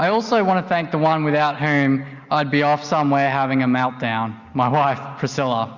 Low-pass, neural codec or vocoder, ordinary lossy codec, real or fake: 7.2 kHz; none; Opus, 64 kbps; real